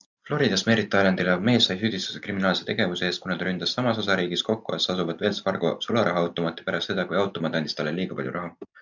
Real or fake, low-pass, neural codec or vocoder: real; 7.2 kHz; none